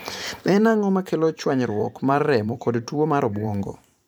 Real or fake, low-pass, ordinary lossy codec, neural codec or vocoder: fake; 19.8 kHz; none; vocoder, 44.1 kHz, 128 mel bands every 512 samples, BigVGAN v2